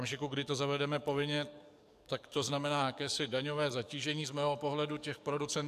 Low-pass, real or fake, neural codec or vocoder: 14.4 kHz; fake; codec, 44.1 kHz, 7.8 kbps, DAC